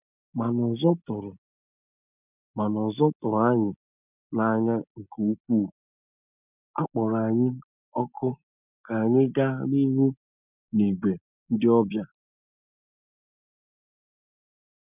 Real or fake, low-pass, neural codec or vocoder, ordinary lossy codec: real; 3.6 kHz; none; none